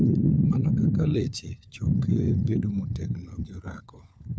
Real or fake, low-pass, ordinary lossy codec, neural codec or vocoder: fake; none; none; codec, 16 kHz, 4 kbps, FunCodec, trained on LibriTTS, 50 frames a second